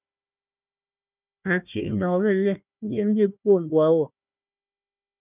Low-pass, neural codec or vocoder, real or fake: 3.6 kHz; codec, 16 kHz, 1 kbps, FunCodec, trained on Chinese and English, 50 frames a second; fake